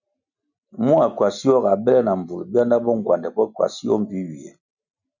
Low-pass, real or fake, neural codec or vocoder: 7.2 kHz; real; none